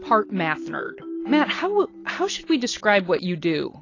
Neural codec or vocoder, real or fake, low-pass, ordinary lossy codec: none; real; 7.2 kHz; AAC, 32 kbps